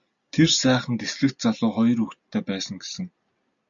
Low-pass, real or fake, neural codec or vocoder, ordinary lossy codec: 7.2 kHz; real; none; MP3, 64 kbps